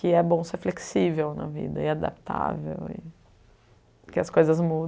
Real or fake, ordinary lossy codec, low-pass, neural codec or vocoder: real; none; none; none